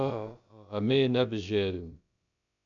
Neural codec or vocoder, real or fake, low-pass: codec, 16 kHz, about 1 kbps, DyCAST, with the encoder's durations; fake; 7.2 kHz